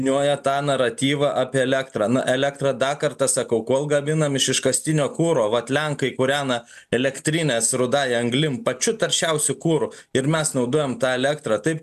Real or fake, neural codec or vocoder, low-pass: real; none; 14.4 kHz